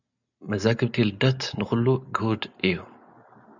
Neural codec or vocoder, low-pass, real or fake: none; 7.2 kHz; real